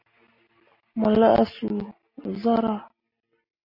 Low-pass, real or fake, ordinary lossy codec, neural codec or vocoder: 5.4 kHz; real; MP3, 32 kbps; none